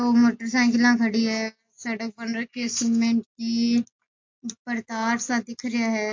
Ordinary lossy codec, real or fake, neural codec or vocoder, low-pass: MP3, 48 kbps; real; none; 7.2 kHz